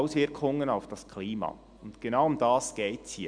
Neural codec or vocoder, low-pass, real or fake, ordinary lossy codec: none; 9.9 kHz; real; none